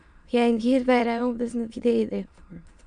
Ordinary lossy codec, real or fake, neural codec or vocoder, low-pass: MP3, 96 kbps; fake; autoencoder, 22.05 kHz, a latent of 192 numbers a frame, VITS, trained on many speakers; 9.9 kHz